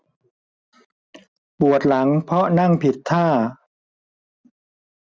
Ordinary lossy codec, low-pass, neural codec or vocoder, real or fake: none; none; none; real